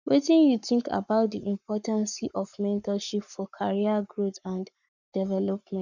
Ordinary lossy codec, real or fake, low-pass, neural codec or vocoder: none; fake; 7.2 kHz; codec, 44.1 kHz, 7.8 kbps, Pupu-Codec